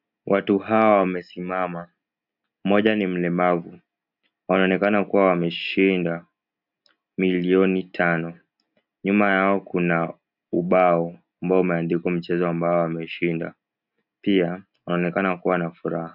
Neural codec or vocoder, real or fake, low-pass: none; real; 5.4 kHz